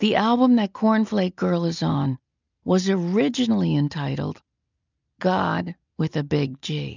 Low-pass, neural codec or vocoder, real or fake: 7.2 kHz; none; real